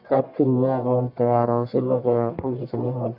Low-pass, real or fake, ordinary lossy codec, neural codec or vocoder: 5.4 kHz; fake; none; codec, 44.1 kHz, 1.7 kbps, Pupu-Codec